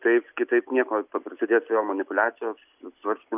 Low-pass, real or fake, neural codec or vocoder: 3.6 kHz; real; none